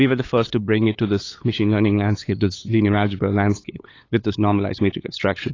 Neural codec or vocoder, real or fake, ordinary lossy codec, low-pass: codec, 16 kHz, 8 kbps, FunCodec, trained on LibriTTS, 25 frames a second; fake; AAC, 32 kbps; 7.2 kHz